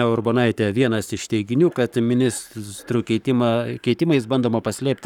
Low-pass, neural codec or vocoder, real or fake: 19.8 kHz; codec, 44.1 kHz, 7.8 kbps, Pupu-Codec; fake